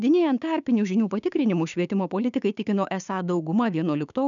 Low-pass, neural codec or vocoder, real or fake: 7.2 kHz; codec, 16 kHz, 6 kbps, DAC; fake